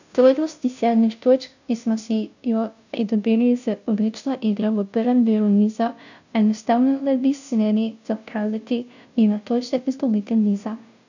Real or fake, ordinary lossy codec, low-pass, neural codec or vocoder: fake; none; 7.2 kHz; codec, 16 kHz, 0.5 kbps, FunCodec, trained on Chinese and English, 25 frames a second